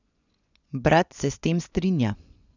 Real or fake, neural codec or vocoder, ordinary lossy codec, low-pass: fake; vocoder, 24 kHz, 100 mel bands, Vocos; none; 7.2 kHz